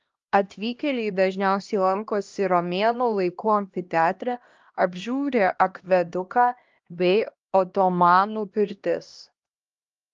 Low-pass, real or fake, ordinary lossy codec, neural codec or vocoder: 7.2 kHz; fake; Opus, 32 kbps; codec, 16 kHz, 1 kbps, X-Codec, HuBERT features, trained on LibriSpeech